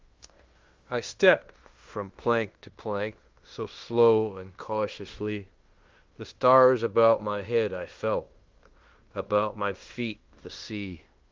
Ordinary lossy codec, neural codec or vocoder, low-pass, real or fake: Opus, 32 kbps; codec, 16 kHz in and 24 kHz out, 0.9 kbps, LongCat-Audio-Codec, fine tuned four codebook decoder; 7.2 kHz; fake